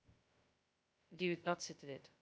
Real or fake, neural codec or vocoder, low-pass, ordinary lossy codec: fake; codec, 16 kHz, 0.2 kbps, FocalCodec; none; none